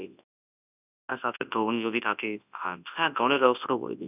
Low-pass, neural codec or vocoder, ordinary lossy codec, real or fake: 3.6 kHz; codec, 24 kHz, 0.9 kbps, WavTokenizer, large speech release; none; fake